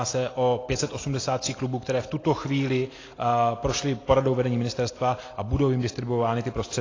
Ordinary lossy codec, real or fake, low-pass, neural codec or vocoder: AAC, 32 kbps; real; 7.2 kHz; none